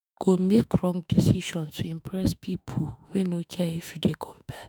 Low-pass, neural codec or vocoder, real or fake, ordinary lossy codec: none; autoencoder, 48 kHz, 32 numbers a frame, DAC-VAE, trained on Japanese speech; fake; none